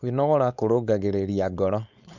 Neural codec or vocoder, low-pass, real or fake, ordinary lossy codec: codec, 16 kHz, 4.8 kbps, FACodec; 7.2 kHz; fake; none